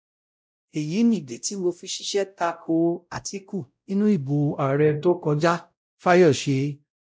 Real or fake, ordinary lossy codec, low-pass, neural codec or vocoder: fake; none; none; codec, 16 kHz, 0.5 kbps, X-Codec, WavLM features, trained on Multilingual LibriSpeech